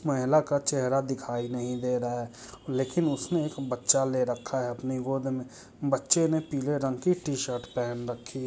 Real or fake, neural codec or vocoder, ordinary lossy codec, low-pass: real; none; none; none